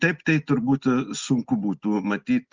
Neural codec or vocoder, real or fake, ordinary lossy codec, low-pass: none; real; Opus, 32 kbps; 7.2 kHz